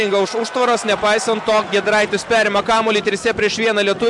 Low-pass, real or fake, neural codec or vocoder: 10.8 kHz; real; none